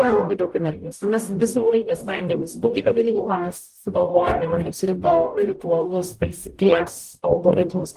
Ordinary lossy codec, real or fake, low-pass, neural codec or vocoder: Opus, 24 kbps; fake; 14.4 kHz; codec, 44.1 kHz, 0.9 kbps, DAC